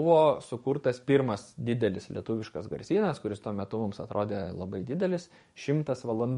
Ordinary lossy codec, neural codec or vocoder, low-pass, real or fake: MP3, 48 kbps; codec, 44.1 kHz, 7.8 kbps, DAC; 19.8 kHz; fake